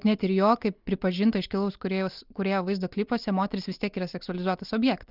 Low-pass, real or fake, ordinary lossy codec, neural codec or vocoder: 5.4 kHz; real; Opus, 32 kbps; none